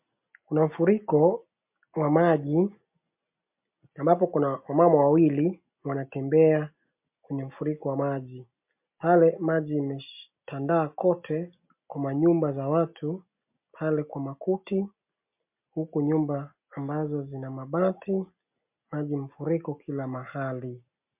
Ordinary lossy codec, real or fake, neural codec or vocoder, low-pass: MP3, 32 kbps; real; none; 3.6 kHz